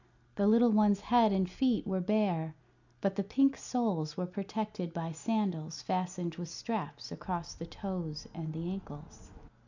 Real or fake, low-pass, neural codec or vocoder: real; 7.2 kHz; none